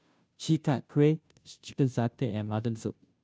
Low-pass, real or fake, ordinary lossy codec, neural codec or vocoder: none; fake; none; codec, 16 kHz, 0.5 kbps, FunCodec, trained on Chinese and English, 25 frames a second